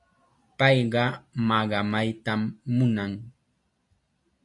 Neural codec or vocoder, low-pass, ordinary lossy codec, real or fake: none; 10.8 kHz; AAC, 64 kbps; real